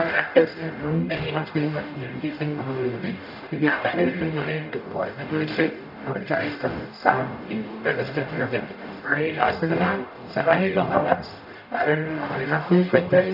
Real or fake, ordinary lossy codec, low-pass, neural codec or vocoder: fake; none; 5.4 kHz; codec, 44.1 kHz, 0.9 kbps, DAC